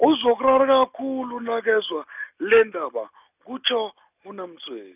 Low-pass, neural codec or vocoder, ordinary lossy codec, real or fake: 3.6 kHz; none; none; real